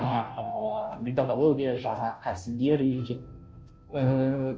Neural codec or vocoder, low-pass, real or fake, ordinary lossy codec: codec, 16 kHz, 0.5 kbps, FunCodec, trained on Chinese and English, 25 frames a second; none; fake; none